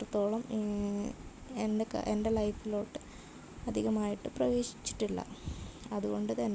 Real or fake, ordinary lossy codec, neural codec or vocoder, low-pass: real; none; none; none